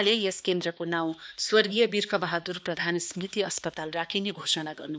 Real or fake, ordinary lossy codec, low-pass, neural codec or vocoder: fake; none; none; codec, 16 kHz, 2 kbps, X-Codec, HuBERT features, trained on balanced general audio